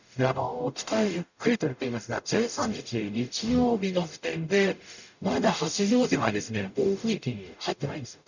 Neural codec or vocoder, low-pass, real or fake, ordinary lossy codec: codec, 44.1 kHz, 0.9 kbps, DAC; 7.2 kHz; fake; none